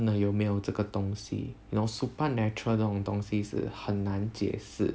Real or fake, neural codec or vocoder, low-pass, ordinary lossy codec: real; none; none; none